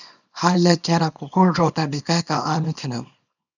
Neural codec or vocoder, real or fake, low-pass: codec, 24 kHz, 0.9 kbps, WavTokenizer, small release; fake; 7.2 kHz